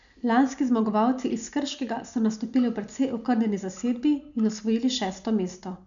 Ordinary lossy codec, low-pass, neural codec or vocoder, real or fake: none; 7.2 kHz; none; real